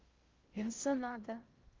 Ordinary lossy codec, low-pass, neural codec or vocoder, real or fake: Opus, 32 kbps; 7.2 kHz; codec, 16 kHz in and 24 kHz out, 0.6 kbps, FocalCodec, streaming, 2048 codes; fake